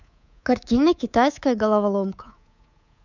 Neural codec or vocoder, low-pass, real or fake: codec, 24 kHz, 3.1 kbps, DualCodec; 7.2 kHz; fake